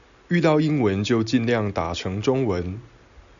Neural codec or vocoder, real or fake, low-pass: none; real; 7.2 kHz